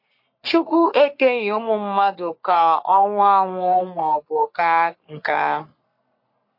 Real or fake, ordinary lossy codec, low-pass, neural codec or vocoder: fake; MP3, 32 kbps; 5.4 kHz; codec, 44.1 kHz, 3.4 kbps, Pupu-Codec